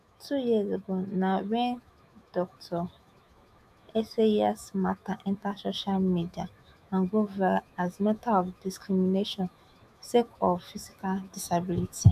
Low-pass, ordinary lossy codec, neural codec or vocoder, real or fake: 14.4 kHz; none; autoencoder, 48 kHz, 128 numbers a frame, DAC-VAE, trained on Japanese speech; fake